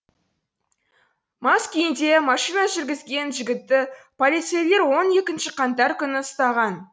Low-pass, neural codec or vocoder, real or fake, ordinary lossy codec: none; none; real; none